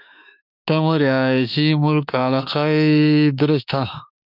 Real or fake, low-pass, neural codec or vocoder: fake; 5.4 kHz; autoencoder, 48 kHz, 32 numbers a frame, DAC-VAE, trained on Japanese speech